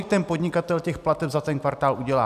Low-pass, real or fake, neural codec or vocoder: 14.4 kHz; real; none